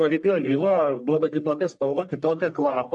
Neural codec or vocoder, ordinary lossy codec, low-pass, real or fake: codec, 44.1 kHz, 1.7 kbps, Pupu-Codec; MP3, 96 kbps; 10.8 kHz; fake